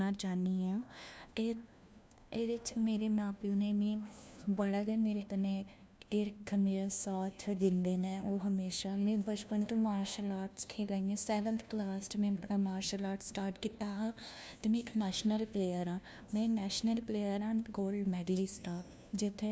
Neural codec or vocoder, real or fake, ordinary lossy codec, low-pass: codec, 16 kHz, 1 kbps, FunCodec, trained on LibriTTS, 50 frames a second; fake; none; none